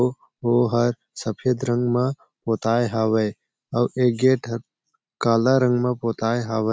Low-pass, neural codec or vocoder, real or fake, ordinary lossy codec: none; none; real; none